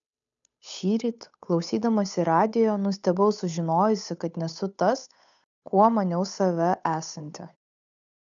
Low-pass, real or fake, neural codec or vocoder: 7.2 kHz; fake; codec, 16 kHz, 8 kbps, FunCodec, trained on Chinese and English, 25 frames a second